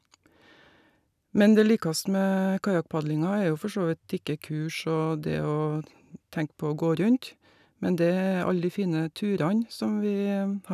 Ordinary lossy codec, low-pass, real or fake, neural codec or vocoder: none; 14.4 kHz; real; none